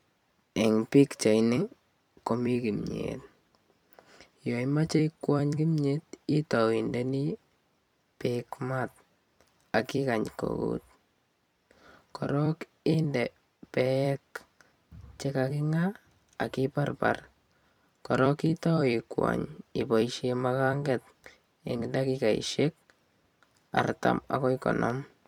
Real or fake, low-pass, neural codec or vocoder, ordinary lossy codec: fake; 19.8 kHz; vocoder, 44.1 kHz, 128 mel bands every 256 samples, BigVGAN v2; none